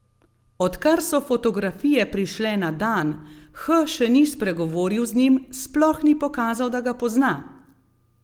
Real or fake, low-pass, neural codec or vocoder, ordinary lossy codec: real; 19.8 kHz; none; Opus, 24 kbps